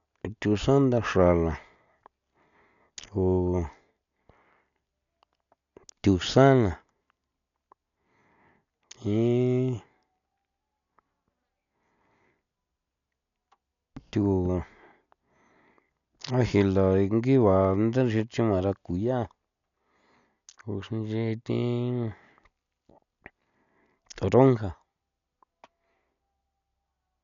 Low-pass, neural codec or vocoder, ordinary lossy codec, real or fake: 7.2 kHz; none; none; real